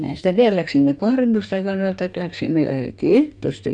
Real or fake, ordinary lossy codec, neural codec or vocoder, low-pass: fake; none; codec, 44.1 kHz, 2.6 kbps, DAC; 9.9 kHz